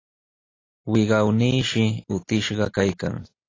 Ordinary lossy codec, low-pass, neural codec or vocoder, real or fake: AAC, 32 kbps; 7.2 kHz; none; real